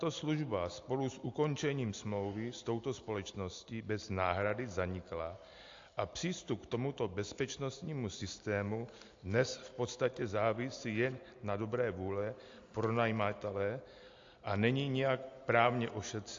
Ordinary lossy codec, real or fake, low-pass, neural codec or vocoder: AAC, 48 kbps; real; 7.2 kHz; none